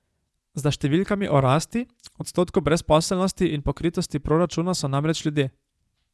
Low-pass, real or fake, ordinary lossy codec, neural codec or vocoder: none; fake; none; vocoder, 24 kHz, 100 mel bands, Vocos